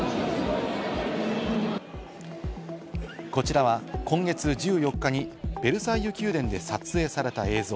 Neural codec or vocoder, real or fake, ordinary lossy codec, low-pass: none; real; none; none